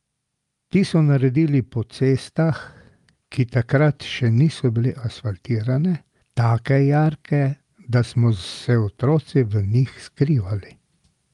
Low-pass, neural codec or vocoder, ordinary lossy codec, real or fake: 10.8 kHz; none; Opus, 32 kbps; real